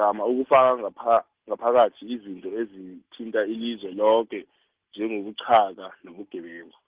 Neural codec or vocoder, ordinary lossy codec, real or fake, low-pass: none; Opus, 16 kbps; real; 3.6 kHz